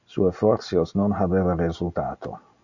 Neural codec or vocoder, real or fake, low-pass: none; real; 7.2 kHz